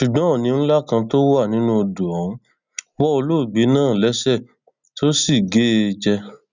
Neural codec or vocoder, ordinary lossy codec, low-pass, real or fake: none; none; 7.2 kHz; real